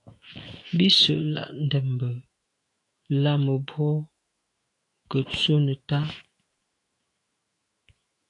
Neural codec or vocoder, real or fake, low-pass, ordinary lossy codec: autoencoder, 48 kHz, 128 numbers a frame, DAC-VAE, trained on Japanese speech; fake; 10.8 kHz; AAC, 48 kbps